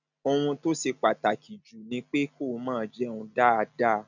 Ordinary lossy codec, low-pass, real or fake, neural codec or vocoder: none; 7.2 kHz; real; none